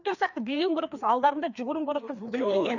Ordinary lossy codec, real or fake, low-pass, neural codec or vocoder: none; fake; 7.2 kHz; codec, 16 kHz, 2 kbps, FreqCodec, larger model